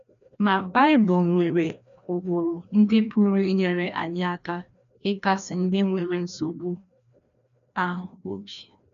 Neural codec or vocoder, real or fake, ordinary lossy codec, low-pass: codec, 16 kHz, 1 kbps, FreqCodec, larger model; fake; none; 7.2 kHz